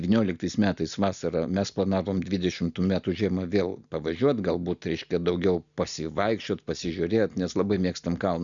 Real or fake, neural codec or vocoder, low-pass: real; none; 7.2 kHz